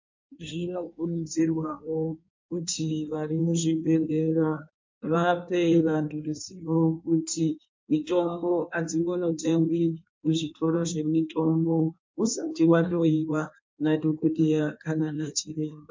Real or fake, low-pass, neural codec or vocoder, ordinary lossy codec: fake; 7.2 kHz; codec, 16 kHz in and 24 kHz out, 1.1 kbps, FireRedTTS-2 codec; MP3, 48 kbps